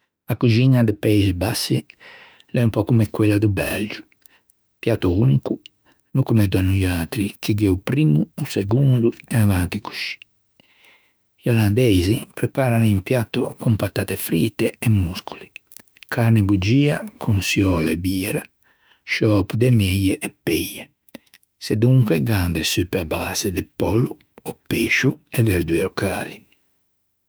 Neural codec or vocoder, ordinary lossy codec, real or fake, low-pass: autoencoder, 48 kHz, 32 numbers a frame, DAC-VAE, trained on Japanese speech; none; fake; none